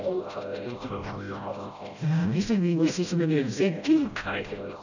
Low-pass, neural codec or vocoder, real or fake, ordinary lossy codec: 7.2 kHz; codec, 16 kHz, 0.5 kbps, FreqCodec, smaller model; fake; none